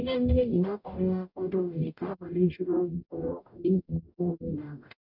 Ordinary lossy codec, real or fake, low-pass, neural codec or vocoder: none; fake; 5.4 kHz; codec, 44.1 kHz, 0.9 kbps, DAC